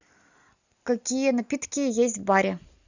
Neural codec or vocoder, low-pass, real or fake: none; 7.2 kHz; real